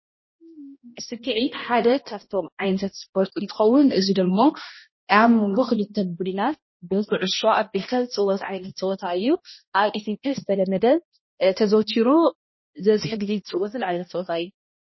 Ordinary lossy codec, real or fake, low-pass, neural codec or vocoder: MP3, 24 kbps; fake; 7.2 kHz; codec, 16 kHz, 1 kbps, X-Codec, HuBERT features, trained on balanced general audio